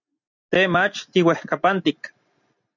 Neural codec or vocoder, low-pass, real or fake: none; 7.2 kHz; real